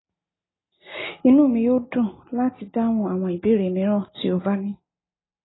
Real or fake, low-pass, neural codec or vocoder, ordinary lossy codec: real; 7.2 kHz; none; AAC, 16 kbps